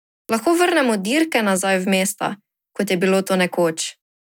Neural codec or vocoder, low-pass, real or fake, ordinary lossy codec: none; none; real; none